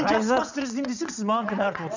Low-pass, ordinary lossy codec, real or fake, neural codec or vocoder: 7.2 kHz; none; fake; vocoder, 22.05 kHz, 80 mel bands, WaveNeXt